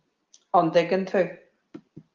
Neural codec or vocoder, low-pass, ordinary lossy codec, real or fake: none; 7.2 kHz; Opus, 24 kbps; real